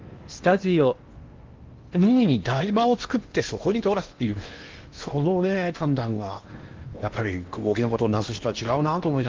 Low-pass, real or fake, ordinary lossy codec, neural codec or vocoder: 7.2 kHz; fake; Opus, 32 kbps; codec, 16 kHz in and 24 kHz out, 0.8 kbps, FocalCodec, streaming, 65536 codes